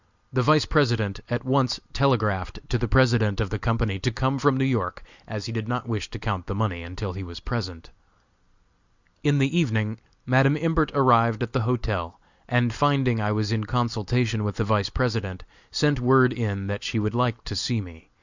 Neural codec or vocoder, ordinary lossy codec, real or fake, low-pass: none; Opus, 64 kbps; real; 7.2 kHz